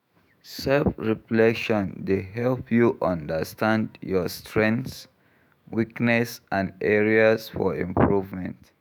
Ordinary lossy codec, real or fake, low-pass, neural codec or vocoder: none; fake; none; autoencoder, 48 kHz, 128 numbers a frame, DAC-VAE, trained on Japanese speech